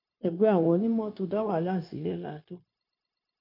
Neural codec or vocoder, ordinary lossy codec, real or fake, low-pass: codec, 16 kHz, 0.9 kbps, LongCat-Audio-Codec; none; fake; 5.4 kHz